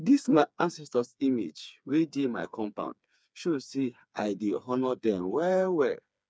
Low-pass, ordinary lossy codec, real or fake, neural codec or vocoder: none; none; fake; codec, 16 kHz, 4 kbps, FreqCodec, smaller model